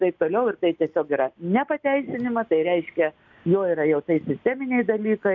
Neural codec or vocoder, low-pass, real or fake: none; 7.2 kHz; real